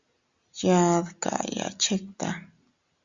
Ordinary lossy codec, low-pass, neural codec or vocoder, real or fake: Opus, 64 kbps; 7.2 kHz; none; real